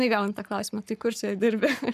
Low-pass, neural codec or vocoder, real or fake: 14.4 kHz; codec, 44.1 kHz, 7.8 kbps, Pupu-Codec; fake